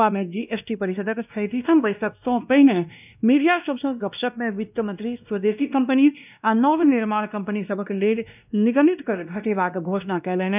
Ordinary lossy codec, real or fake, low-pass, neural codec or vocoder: none; fake; 3.6 kHz; codec, 16 kHz, 1 kbps, X-Codec, WavLM features, trained on Multilingual LibriSpeech